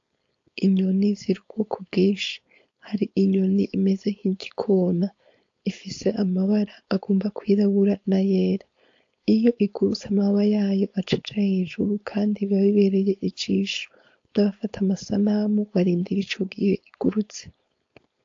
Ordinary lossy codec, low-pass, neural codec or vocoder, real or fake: AAC, 48 kbps; 7.2 kHz; codec, 16 kHz, 4.8 kbps, FACodec; fake